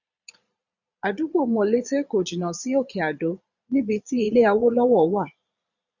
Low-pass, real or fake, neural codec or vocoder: 7.2 kHz; fake; vocoder, 22.05 kHz, 80 mel bands, Vocos